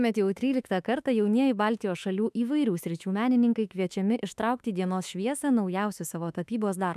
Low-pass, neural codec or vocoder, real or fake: 14.4 kHz; autoencoder, 48 kHz, 32 numbers a frame, DAC-VAE, trained on Japanese speech; fake